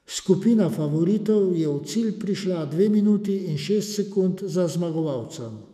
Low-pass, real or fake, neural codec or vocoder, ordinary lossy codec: 14.4 kHz; fake; autoencoder, 48 kHz, 128 numbers a frame, DAC-VAE, trained on Japanese speech; none